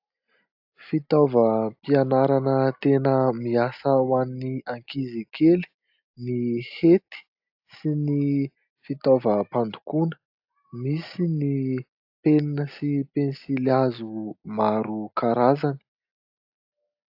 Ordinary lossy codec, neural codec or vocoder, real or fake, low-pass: AAC, 48 kbps; none; real; 5.4 kHz